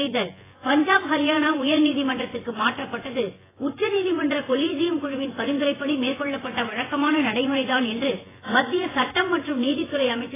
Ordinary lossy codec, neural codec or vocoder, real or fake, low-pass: AAC, 16 kbps; vocoder, 24 kHz, 100 mel bands, Vocos; fake; 3.6 kHz